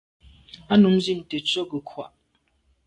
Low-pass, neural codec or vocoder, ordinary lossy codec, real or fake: 10.8 kHz; none; AAC, 48 kbps; real